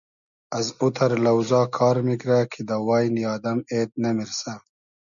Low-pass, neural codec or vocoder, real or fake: 7.2 kHz; none; real